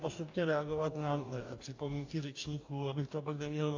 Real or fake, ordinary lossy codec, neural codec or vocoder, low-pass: fake; AAC, 48 kbps; codec, 44.1 kHz, 2.6 kbps, DAC; 7.2 kHz